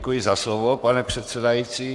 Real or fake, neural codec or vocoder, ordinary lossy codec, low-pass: fake; codec, 44.1 kHz, 7.8 kbps, Pupu-Codec; Opus, 64 kbps; 10.8 kHz